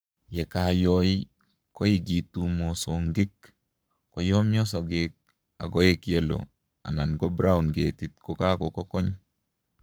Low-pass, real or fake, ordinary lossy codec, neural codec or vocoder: none; fake; none; codec, 44.1 kHz, 7.8 kbps, Pupu-Codec